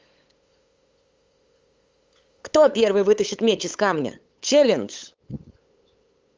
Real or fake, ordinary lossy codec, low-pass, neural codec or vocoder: fake; Opus, 32 kbps; 7.2 kHz; codec, 16 kHz, 8 kbps, FunCodec, trained on LibriTTS, 25 frames a second